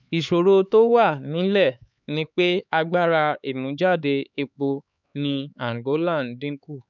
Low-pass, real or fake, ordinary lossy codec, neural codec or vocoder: 7.2 kHz; fake; none; codec, 16 kHz, 4 kbps, X-Codec, HuBERT features, trained on LibriSpeech